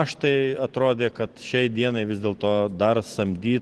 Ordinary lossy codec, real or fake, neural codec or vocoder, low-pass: Opus, 16 kbps; fake; vocoder, 44.1 kHz, 128 mel bands every 512 samples, BigVGAN v2; 10.8 kHz